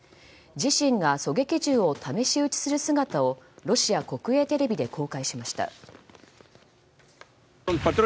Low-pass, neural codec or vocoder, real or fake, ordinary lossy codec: none; none; real; none